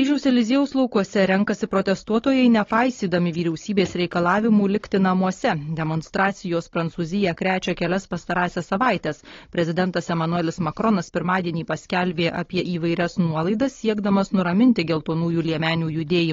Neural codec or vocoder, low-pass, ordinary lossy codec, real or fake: codec, 16 kHz, 16 kbps, FunCodec, trained on Chinese and English, 50 frames a second; 7.2 kHz; AAC, 32 kbps; fake